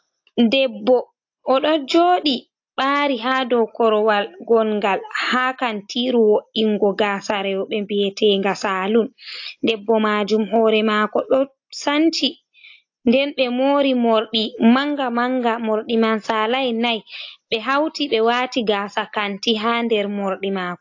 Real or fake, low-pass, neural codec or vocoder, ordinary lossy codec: real; 7.2 kHz; none; AAC, 48 kbps